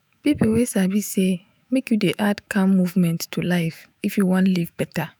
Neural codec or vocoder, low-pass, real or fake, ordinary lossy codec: autoencoder, 48 kHz, 128 numbers a frame, DAC-VAE, trained on Japanese speech; none; fake; none